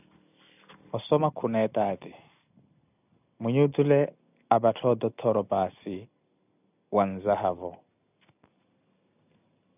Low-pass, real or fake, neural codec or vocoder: 3.6 kHz; real; none